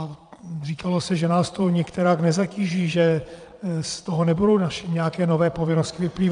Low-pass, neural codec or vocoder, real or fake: 9.9 kHz; vocoder, 22.05 kHz, 80 mel bands, Vocos; fake